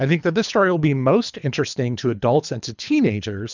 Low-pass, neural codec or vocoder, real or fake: 7.2 kHz; codec, 24 kHz, 3 kbps, HILCodec; fake